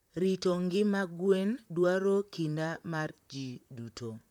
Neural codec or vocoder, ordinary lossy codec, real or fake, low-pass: vocoder, 44.1 kHz, 128 mel bands, Pupu-Vocoder; none; fake; 19.8 kHz